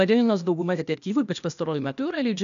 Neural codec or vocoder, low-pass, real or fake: codec, 16 kHz, 0.8 kbps, ZipCodec; 7.2 kHz; fake